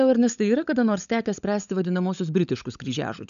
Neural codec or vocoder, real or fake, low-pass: codec, 16 kHz, 16 kbps, FunCodec, trained on LibriTTS, 50 frames a second; fake; 7.2 kHz